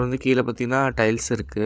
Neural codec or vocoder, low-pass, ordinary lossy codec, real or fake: codec, 16 kHz, 8 kbps, FreqCodec, larger model; none; none; fake